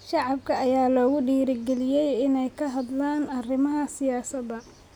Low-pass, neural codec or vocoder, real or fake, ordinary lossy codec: 19.8 kHz; none; real; none